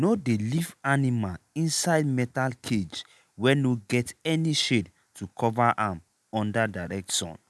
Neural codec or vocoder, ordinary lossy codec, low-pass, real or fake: none; none; none; real